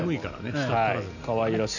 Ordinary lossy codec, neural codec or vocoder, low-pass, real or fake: none; none; 7.2 kHz; real